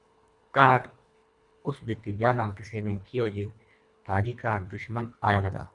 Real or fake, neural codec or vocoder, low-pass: fake; codec, 24 kHz, 1.5 kbps, HILCodec; 10.8 kHz